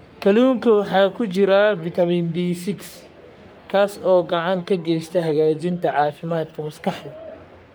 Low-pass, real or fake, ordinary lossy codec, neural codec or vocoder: none; fake; none; codec, 44.1 kHz, 3.4 kbps, Pupu-Codec